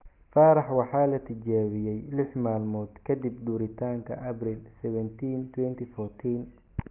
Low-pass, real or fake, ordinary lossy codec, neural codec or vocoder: 3.6 kHz; real; Opus, 24 kbps; none